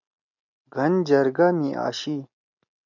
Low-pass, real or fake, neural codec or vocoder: 7.2 kHz; real; none